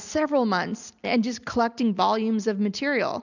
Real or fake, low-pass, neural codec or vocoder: real; 7.2 kHz; none